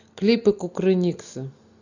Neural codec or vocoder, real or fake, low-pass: none; real; 7.2 kHz